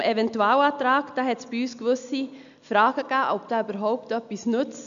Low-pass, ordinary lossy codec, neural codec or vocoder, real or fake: 7.2 kHz; none; none; real